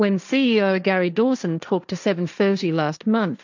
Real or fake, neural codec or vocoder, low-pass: fake; codec, 16 kHz, 1.1 kbps, Voila-Tokenizer; 7.2 kHz